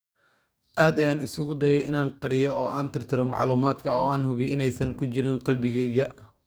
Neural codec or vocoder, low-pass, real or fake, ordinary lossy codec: codec, 44.1 kHz, 2.6 kbps, DAC; none; fake; none